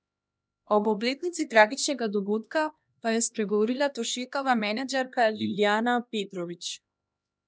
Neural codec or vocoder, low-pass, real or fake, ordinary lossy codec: codec, 16 kHz, 1 kbps, X-Codec, HuBERT features, trained on LibriSpeech; none; fake; none